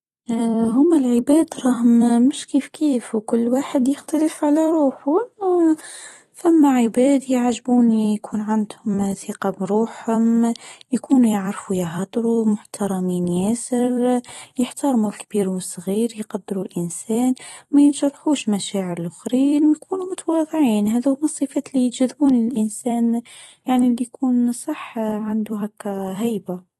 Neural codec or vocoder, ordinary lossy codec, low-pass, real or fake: vocoder, 44.1 kHz, 128 mel bands every 256 samples, BigVGAN v2; AAC, 32 kbps; 19.8 kHz; fake